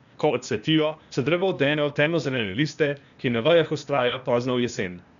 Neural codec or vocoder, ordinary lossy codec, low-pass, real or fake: codec, 16 kHz, 0.8 kbps, ZipCodec; none; 7.2 kHz; fake